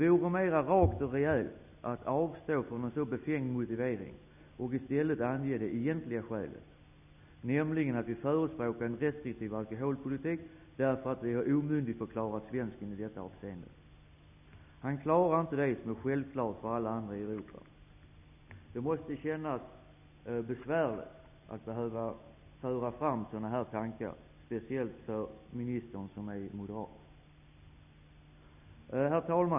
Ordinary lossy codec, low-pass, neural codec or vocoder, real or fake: none; 3.6 kHz; none; real